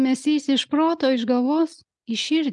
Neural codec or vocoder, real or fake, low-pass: none; real; 10.8 kHz